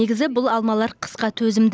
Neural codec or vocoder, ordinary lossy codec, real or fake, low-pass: none; none; real; none